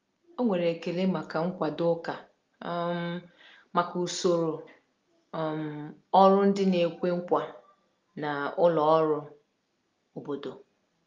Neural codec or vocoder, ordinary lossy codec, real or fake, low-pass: none; Opus, 24 kbps; real; 7.2 kHz